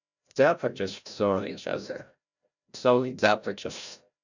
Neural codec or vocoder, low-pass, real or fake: codec, 16 kHz, 0.5 kbps, FreqCodec, larger model; 7.2 kHz; fake